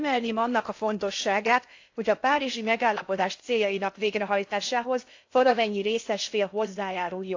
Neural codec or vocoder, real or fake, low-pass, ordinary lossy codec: codec, 16 kHz in and 24 kHz out, 0.6 kbps, FocalCodec, streaming, 2048 codes; fake; 7.2 kHz; AAC, 48 kbps